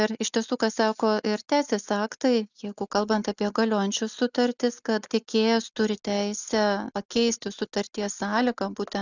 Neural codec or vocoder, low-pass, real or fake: none; 7.2 kHz; real